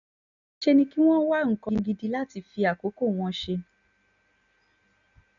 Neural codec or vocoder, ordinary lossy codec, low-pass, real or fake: none; none; 7.2 kHz; real